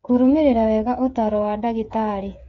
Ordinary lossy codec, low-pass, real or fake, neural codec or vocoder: none; 7.2 kHz; fake; codec, 16 kHz, 8 kbps, FreqCodec, smaller model